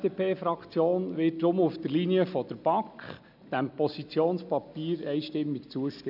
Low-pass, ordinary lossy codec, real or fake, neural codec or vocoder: 5.4 kHz; none; real; none